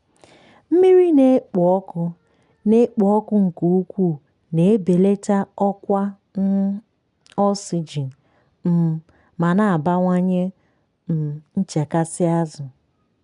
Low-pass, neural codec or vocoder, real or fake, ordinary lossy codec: 10.8 kHz; none; real; none